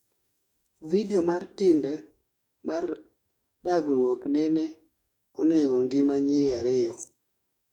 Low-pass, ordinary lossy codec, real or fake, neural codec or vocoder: 19.8 kHz; none; fake; codec, 44.1 kHz, 2.6 kbps, DAC